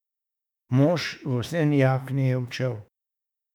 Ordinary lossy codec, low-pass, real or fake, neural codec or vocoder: none; 19.8 kHz; fake; autoencoder, 48 kHz, 32 numbers a frame, DAC-VAE, trained on Japanese speech